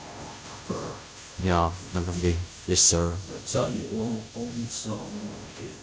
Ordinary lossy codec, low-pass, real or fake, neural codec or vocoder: none; none; fake; codec, 16 kHz, 0.5 kbps, FunCodec, trained on Chinese and English, 25 frames a second